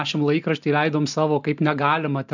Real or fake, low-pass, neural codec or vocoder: real; 7.2 kHz; none